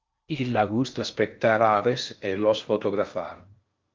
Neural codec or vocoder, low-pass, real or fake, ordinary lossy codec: codec, 16 kHz in and 24 kHz out, 0.6 kbps, FocalCodec, streaming, 4096 codes; 7.2 kHz; fake; Opus, 24 kbps